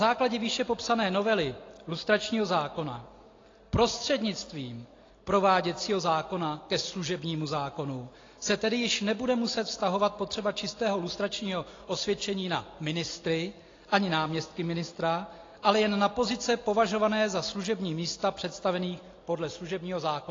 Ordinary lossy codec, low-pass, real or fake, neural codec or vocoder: AAC, 32 kbps; 7.2 kHz; real; none